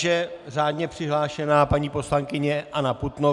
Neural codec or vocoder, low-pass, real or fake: none; 10.8 kHz; real